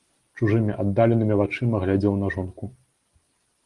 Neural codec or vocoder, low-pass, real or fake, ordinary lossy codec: none; 10.8 kHz; real; Opus, 24 kbps